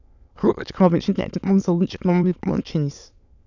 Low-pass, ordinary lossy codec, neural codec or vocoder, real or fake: 7.2 kHz; none; autoencoder, 22.05 kHz, a latent of 192 numbers a frame, VITS, trained on many speakers; fake